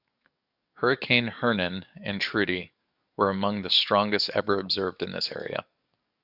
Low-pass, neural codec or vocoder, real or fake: 5.4 kHz; codec, 44.1 kHz, 7.8 kbps, DAC; fake